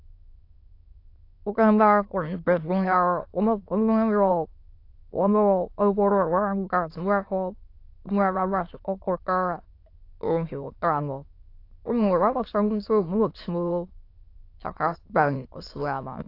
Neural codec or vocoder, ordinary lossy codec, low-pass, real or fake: autoencoder, 22.05 kHz, a latent of 192 numbers a frame, VITS, trained on many speakers; AAC, 32 kbps; 5.4 kHz; fake